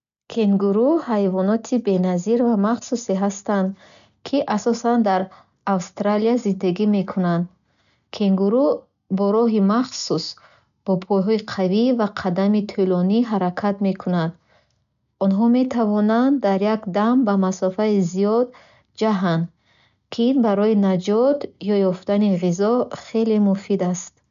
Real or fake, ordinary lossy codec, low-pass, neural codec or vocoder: real; none; 7.2 kHz; none